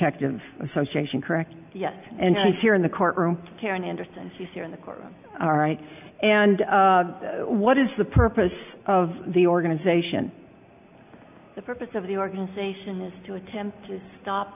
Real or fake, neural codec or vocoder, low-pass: real; none; 3.6 kHz